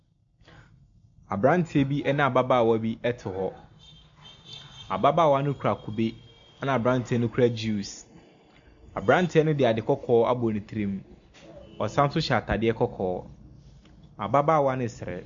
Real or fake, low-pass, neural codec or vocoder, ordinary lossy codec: real; 7.2 kHz; none; MP3, 64 kbps